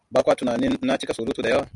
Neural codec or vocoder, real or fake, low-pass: none; real; 10.8 kHz